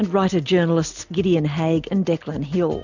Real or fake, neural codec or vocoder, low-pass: real; none; 7.2 kHz